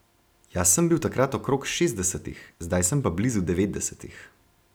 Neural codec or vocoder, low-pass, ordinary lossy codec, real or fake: none; none; none; real